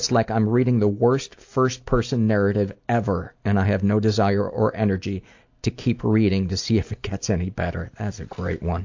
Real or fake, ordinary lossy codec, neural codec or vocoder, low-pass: real; AAC, 48 kbps; none; 7.2 kHz